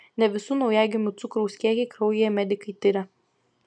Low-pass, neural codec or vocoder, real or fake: 9.9 kHz; none; real